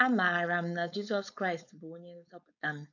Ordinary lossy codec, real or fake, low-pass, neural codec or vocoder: none; fake; 7.2 kHz; codec, 16 kHz, 4.8 kbps, FACodec